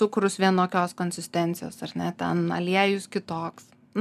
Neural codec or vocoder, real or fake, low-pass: none; real; 14.4 kHz